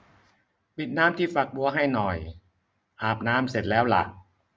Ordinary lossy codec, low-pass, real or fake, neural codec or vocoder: none; none; real; none